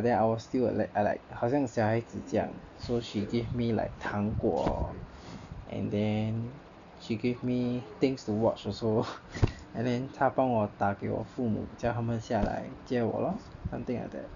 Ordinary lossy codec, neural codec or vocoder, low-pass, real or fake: none; none; 7.2 kHz; real